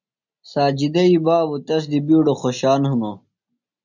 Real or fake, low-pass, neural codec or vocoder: real; 7.2 kHz; none